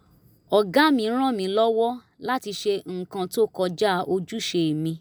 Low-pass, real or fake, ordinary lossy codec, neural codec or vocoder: none; real; none; none